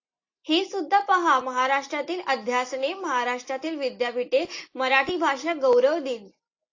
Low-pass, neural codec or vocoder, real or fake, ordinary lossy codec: 7.2 kHz; none; real; AAC, 48 kbps